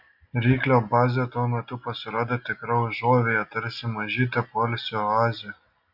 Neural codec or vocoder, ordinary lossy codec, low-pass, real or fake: none; AAC, 48 kbps; 5.4 kHz; real